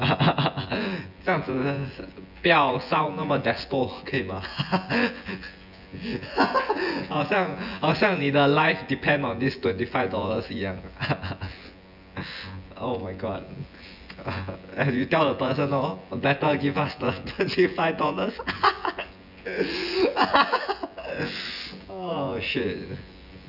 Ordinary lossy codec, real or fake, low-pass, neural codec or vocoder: none; fake; 5.4 kHz; vocoder, 24 kHz, 100 mel bands, Vocos